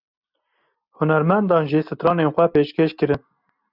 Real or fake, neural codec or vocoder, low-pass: real; none; 5.4 kHz